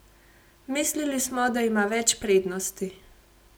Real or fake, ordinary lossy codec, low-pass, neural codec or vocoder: real; none; none; none